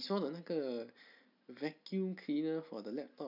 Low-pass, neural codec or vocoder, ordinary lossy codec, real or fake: 5.4 kHz; none; none; real